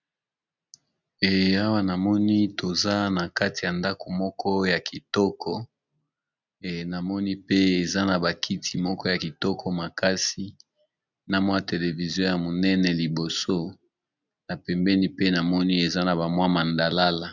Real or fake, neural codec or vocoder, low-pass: real; none; 7.2 kHz